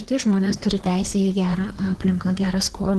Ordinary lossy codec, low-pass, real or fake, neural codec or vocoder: Opus, 16 kbps; 10.8 kHz; fake; codec, 24 kHz, 1 kbps, SNAC